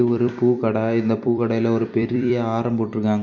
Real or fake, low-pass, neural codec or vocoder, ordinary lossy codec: fake; 7.2 kHz; vocoder, 44.1 kHz, 128 mel bands every 256 samples, BigVGAN v2; none